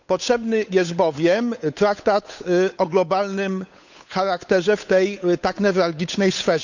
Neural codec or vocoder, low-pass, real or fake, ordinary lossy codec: codec, 16 kHz, 8 kbps, FunCodec, trained on Chinese and English, 25 frames a second; 7.2 kHz; fake; none